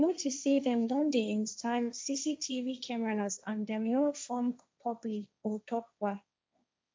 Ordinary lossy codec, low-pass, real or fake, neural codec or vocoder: none; none; fake; codec, 16 kHz, 1.1 kbps, Voila-Tokenizer